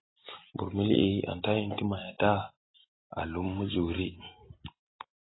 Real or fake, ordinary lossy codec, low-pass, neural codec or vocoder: real; AAC, 16 kbps; 7.2 kHz; none